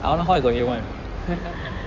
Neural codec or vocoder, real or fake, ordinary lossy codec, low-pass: codec, 16 kHz in and 24 kHz out, 2.2 kbps, FireRedTTS-2 codec; fake; none; 7.2 kHz